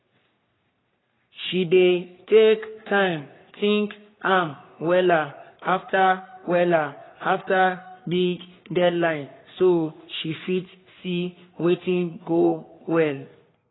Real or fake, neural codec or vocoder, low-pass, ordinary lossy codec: fake; codec, 44.1 kHz, 3.4 kbps, Pupu-Codec; 7.2 kHz; AAC, 16 kbps